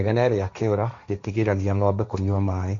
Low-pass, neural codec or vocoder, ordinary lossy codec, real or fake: 7.2 kHz; codec, 16 kHz, 1.1 kbps, Voila-Tokenizer; MP3, 48 kbps; fake